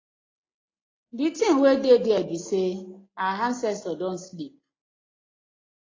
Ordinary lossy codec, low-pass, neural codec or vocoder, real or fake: AAC, 32 kbps; 7.2 kHz; none; real